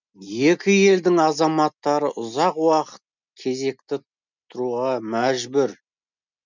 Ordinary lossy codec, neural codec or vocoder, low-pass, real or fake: none; none; 7.2 kHz; real